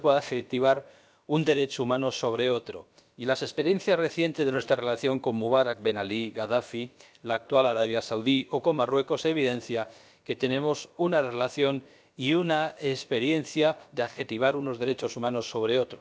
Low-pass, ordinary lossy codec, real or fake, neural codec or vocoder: none; none; fake; codec, 16 kHz, about 1 kbps, DyCAST, with the encoder's durations